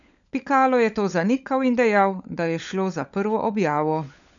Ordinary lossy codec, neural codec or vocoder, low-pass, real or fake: none; codec, 16 kHz, 16 kbps, FunCodec, trained on LibriTTS, 50 frames a second; 7.2 kHz; fake